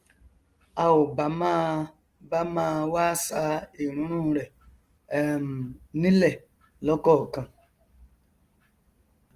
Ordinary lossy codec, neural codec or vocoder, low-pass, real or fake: Opus, 24 kbps; none; 14.4 kHz; real